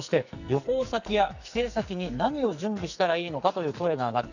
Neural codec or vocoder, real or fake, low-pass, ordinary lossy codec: codec, 44.1 kHz, 2.6 kbps, SNAC; fake; 7.2 kHz; none